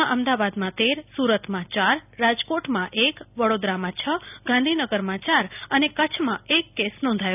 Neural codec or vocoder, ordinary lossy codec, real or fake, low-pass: none; none; real; 3.6 kHz